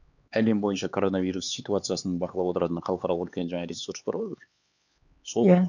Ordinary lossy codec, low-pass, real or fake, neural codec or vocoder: none; 7.2 kHz; fake; codec, 16 kHz, 4 kbps, X-Codec, HuBERT features, trained on LibriSpeech